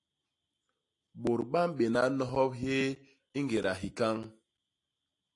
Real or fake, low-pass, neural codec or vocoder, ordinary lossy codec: real; 10.8 kHz; none; AAC, 48 kbps